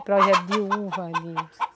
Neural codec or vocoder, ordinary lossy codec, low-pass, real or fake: none; none; none; real